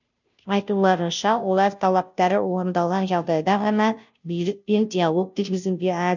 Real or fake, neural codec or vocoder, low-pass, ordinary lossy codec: fake; codec, 16 kHz, 0.5 kbps, FunCodec, trained on Chinese and English, 25 frames a second; 7.2 kHz; none